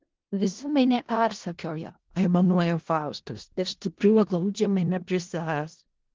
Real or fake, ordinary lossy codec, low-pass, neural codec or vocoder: fake; Opus, 16 kbps; 7.2 kHz; codec, 16 kHz in and 24 kHz out, 0.4 kbps, LongCat-Audio-Codec, four codebook decoder